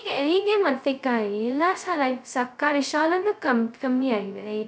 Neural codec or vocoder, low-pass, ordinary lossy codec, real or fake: codec, 16 kHz, 0.2 kbps, FocalCodec; none; none; fake